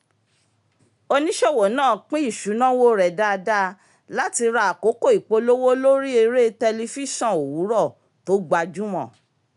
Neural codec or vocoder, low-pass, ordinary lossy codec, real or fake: none; 10.8 kHz; none; real